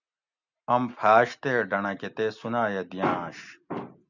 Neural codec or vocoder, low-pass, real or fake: none; 7.2 kHz; real